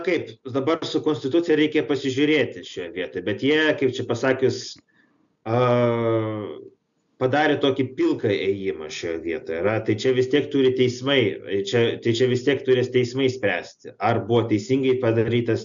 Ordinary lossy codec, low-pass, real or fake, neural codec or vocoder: MP3, 96 kbps; 7.2 kHz; real; none